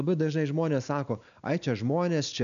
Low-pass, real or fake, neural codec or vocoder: 7.2 kHz; real; none